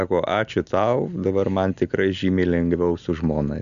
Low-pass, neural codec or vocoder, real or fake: 7.2 kHz; none; real